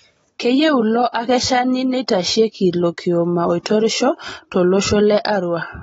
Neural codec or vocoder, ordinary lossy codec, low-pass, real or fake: none; AAC, 24 kbps; 19.8 kHz; real